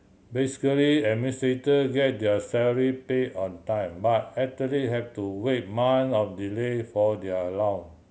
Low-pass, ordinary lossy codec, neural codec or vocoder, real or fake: none; none; none; real